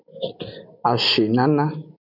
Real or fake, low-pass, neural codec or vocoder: real; 5.4 kHz; none